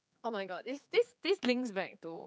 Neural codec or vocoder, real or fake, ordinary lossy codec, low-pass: codec, 16 kHz, 4 kbps, X-Codec, HuBERT features, trained on general audio; fake; none; none